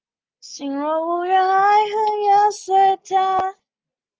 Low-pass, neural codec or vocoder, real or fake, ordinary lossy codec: 7.2 kHz; none; real; Opus, 24 kbps